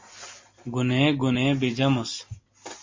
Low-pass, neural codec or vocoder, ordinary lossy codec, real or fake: 7.2 kHz; none; MP3, 32 kbps; real